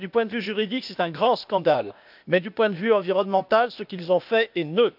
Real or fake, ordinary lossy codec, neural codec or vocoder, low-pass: fake; none; codec, 16 kHz, 0.8 kbps, ZipCodec; 5.4 kHz